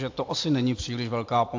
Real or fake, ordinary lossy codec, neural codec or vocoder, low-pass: real; AAC, 48 kbps; none; 7.2 kHz